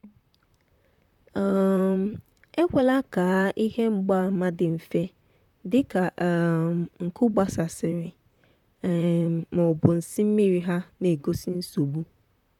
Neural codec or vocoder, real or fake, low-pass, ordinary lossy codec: vocoder, 44.1 kHz, 128 mel bands, Pupu-Vocoder; fake; 19.8 kHz; none